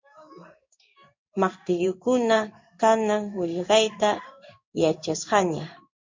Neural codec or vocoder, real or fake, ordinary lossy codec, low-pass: codec, 16 kHz in and 24 kHz out, 1 kbps, XY-Tokenizer; fake; MP3, 64 kbps; 7.2 kHz